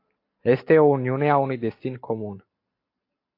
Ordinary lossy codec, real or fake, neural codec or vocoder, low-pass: AAC, 32 kbps; real; none; 5.4 kHz